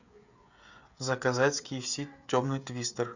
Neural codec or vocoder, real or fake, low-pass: codec, 16 kHz, 16 kbps, FreqCodec, smaller model; fake; 7.2 kHz